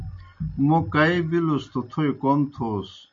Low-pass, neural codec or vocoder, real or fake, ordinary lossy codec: 7.2 kHz; none; real; MP3, 48 kbps